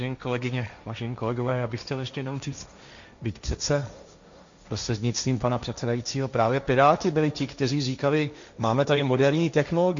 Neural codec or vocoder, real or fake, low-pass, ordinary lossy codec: codec, 16 kHz, 1.1 kbps, Voila-Tokenizer; fake; 7.2 kHz; MP3, 64 kbps